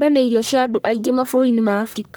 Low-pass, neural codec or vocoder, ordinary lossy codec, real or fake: none; codec, 44.1 kHz, 1.7 kbps, Pupu-Codec; none; fake